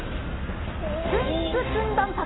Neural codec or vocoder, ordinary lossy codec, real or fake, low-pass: none; AAC, 16 kbps; real; 7.2 kHz